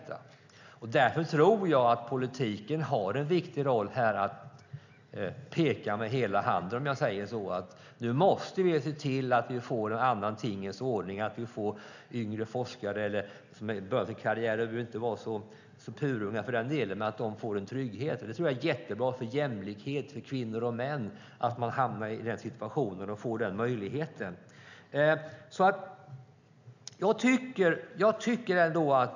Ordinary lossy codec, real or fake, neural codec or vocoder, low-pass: none; real; none; 7.2 kHz